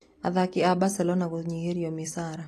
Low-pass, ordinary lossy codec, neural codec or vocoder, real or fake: 14.4 kHz; AAC, 48 kbps; none; real